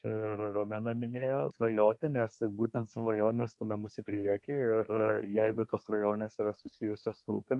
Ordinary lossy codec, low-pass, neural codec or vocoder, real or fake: AAC, 64 kbps; 10.8 kHz; codec, 24 kHz, 1 kbps, SNAC; fake